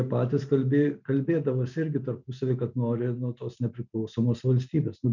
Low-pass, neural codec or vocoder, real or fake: 7.2 kHz; none; real